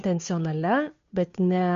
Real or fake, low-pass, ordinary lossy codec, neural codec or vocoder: fake; 7.2 kHz; MP3, 48 kbps; codec, 16 kHz, 2 kbps, FunCodec, trained on Chinese and English, 25 frames a second